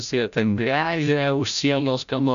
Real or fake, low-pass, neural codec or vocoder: fake; 7.2 kHz; codec, 16 kHz, 0.5 kbps, FreqCodec, larger model